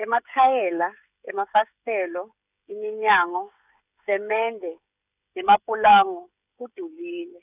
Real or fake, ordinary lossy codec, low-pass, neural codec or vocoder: fake; none; 3.6 kHz; codec, 16 kHz, 16 kbps, FreqCodec, smaller model